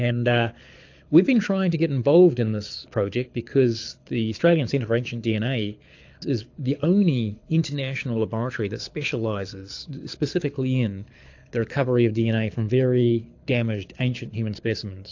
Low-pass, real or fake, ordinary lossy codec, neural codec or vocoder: 7.2 kHz; fake; AAC, 48 kbps; codec, 24 kHz, 6 kbps, HILCodec